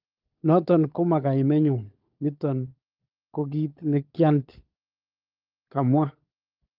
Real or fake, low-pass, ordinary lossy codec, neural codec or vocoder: fake; 5.4 kHz; Opus, 24 kbps; codec, 16 kHz, 16 kbps, FunCodec, trained on LibriTTS, 50 frames a second